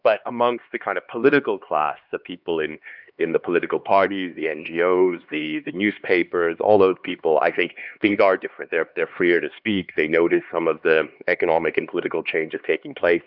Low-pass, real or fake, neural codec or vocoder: 5.4 kHz; fake; codec, 16 kHz, 2 kbps, X-Codec, HuBERT features, trained on LibriSpeech